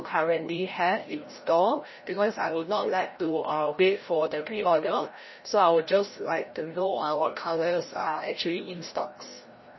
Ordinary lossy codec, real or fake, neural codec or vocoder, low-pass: MP3, 24 kbps; fake; codec, 16 kHz, 0.5 kbps, FreqCodec, larger model; 7.2 kHz